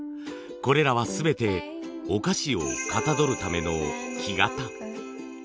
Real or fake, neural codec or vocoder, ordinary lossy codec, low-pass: real; none; none; none